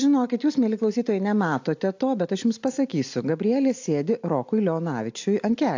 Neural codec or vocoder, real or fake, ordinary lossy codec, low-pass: none; real; AAC, 48 kbps; 7.2 kHz